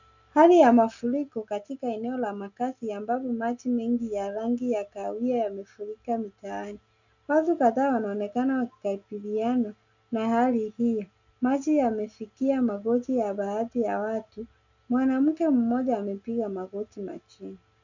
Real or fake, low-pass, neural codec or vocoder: real; 7.2 kHz; none